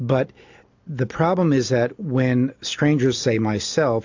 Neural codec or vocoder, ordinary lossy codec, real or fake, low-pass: none; AAC, 48 kbps; real; 7.2 kHz